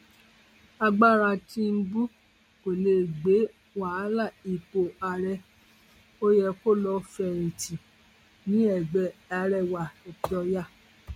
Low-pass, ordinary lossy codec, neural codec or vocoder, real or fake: 19.8 kHz; MP3, 64 kbps; none; real